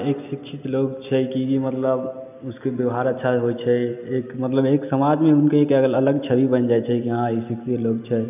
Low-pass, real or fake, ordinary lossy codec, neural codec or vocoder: 3.6 kHz; real; none; none